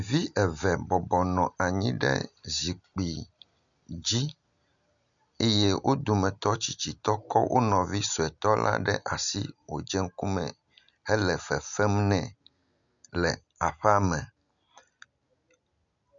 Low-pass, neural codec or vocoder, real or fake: 7.2 kHz; none; real